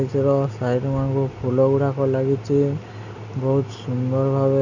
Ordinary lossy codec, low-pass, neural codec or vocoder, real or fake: none; 7.2 kHz; none; real